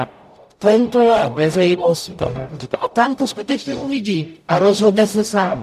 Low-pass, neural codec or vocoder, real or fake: 14.4 kHz; codec, 44.1 kHz, 0.9 kbps, DAC; fake